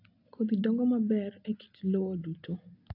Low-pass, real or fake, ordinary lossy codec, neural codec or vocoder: 5.4 kHz; real; AAC, 48 kbps; none